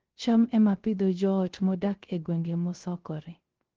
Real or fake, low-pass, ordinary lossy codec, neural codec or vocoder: fake; 7.2 kHz; Opus, 16 kbps; codec, 16 kHz, 0.3 kbps, FocalCodec